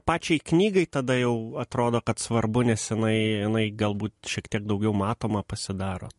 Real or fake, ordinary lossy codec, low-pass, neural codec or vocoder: real; MP3, 48 kbps; 14.4 kHz; none